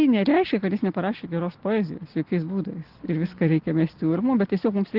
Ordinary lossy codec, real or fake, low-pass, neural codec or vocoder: Opus, 16 kbps; real; 5.4 kHz; none